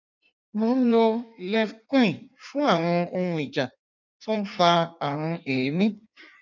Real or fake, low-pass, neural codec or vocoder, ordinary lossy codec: fake; 7.2 kHz; codec, 16 kHz in and 24 kHz out, 1.1 kbps, FireRedTTS-2 codec; none